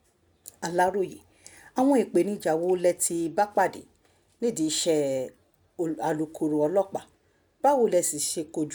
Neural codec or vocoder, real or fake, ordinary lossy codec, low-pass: none; real; none; none